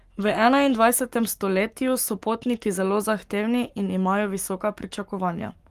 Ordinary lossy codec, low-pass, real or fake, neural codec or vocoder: Opus, 32 kbps; 14.4 kHz; fake; codec, 44.1 kHz, 7.8 kbps, Pupu-Codec